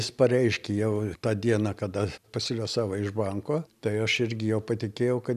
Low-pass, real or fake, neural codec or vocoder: 14.4 kHz; real; none